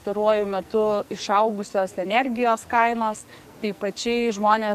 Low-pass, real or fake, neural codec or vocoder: 14.4 kHz; fake; codec, 44.1 kHz, 2.6 kbps, SNAC